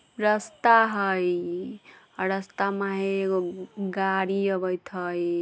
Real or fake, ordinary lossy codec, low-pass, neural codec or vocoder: real; none; none; none